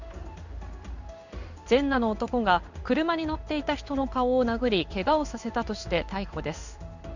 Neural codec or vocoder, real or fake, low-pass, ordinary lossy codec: codec, 16 kHz in and 24 kHz out, 1 kbps, XY-Tokenizer; fake; 7.2 kHz; none